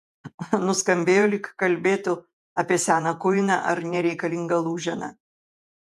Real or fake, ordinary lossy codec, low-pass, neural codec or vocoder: fake; MP3, 96 kbps; 14.4 kHz; vocoder, 48 kHz, 128 mel bands, Vocos